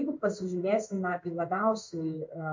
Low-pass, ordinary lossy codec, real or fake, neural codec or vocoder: 7.2 kHz; MP3, 48 kbps; fake; codec, 16 kHz in and 24 kHz out, 1 kbps, XY-Tokenizer